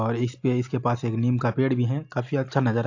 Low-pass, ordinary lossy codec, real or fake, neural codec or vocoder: 7.2 kHz; AAC, 48 kbps; real; none